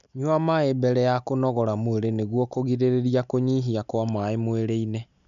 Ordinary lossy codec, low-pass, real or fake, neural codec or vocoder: none; 7.2 kHz; real; none